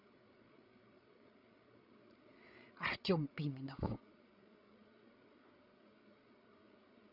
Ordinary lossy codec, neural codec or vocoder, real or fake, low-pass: none; codec, 16 kHz, 8 kbps, FreqCodec, larger model; fake; 5.4 kHz